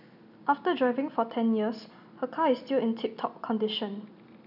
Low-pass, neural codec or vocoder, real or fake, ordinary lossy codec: 5.4 kHz; none; real; none